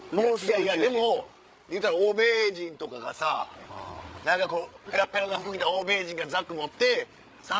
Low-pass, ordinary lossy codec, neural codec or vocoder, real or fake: none; none; codec, 16 kHz, 8 kbps, FreqCodec, larger model; fake